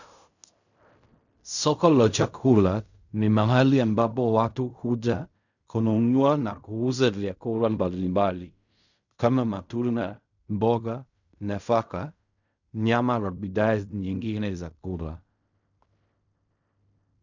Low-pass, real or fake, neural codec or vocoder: 7.2 kHz; fake; codec, 16 kHz in and 24 kHz out, 0.4 kbps, LongCat-Audio-Codec, fine tuned four codebook decoder